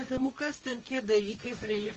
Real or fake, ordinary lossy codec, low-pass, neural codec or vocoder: fake; Opus, 24 kbps; 7.2 kHz; codec, 16 kHz, 1.1 kbps, Voila-Tokenizer